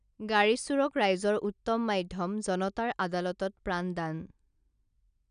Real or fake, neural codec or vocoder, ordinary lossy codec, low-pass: real; none; none; 9.9 kHz